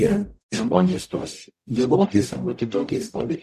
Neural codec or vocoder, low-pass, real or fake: codec, 44.1 kHz, 0.9 kbps, DAC; 14.4 kHz; fake